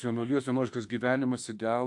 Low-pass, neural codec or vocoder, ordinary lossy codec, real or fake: 10.8 kHz; autoencoder, 48 kHz, 32 numbers a frame, DAC-VAE, trained on Japanese speech; AAC, 64 kbps; fake